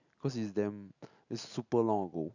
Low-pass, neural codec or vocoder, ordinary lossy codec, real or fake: 7.2 kHz; none; none; real